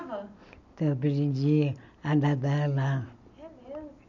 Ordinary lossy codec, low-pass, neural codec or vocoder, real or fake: none; 7.2 kHz; none; real